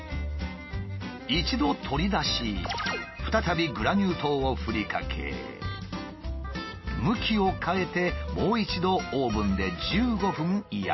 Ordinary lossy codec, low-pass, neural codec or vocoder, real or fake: MP3, 24 kbps; 7.2 kHz; none; real